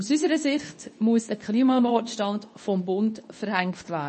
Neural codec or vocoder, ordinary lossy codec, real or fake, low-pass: codec, 24 kHz, 0.9 kbps, WavTokenizer, medium speech release version 2; MP3, 32 kbps; fake; 10.8 kHz